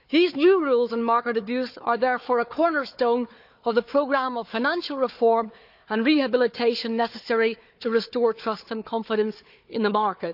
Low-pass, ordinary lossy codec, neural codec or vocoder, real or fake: 5.4 kHz; none; codec, 16 kHz, 4 kbps, FunCodec, trained on Chinese and English, 50 frames a second; fake